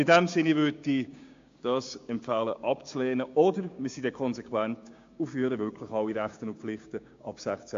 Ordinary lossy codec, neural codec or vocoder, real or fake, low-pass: AAC, 48 kbps; codec, 16 kHz, 6 kbps, DAC; fake; 7.2 kHz